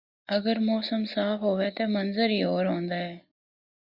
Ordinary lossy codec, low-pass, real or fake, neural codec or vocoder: Opus, 64 kbps; 5.4 kHz; real; none